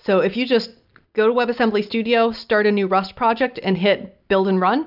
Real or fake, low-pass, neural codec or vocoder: real; 5.4 kHz; none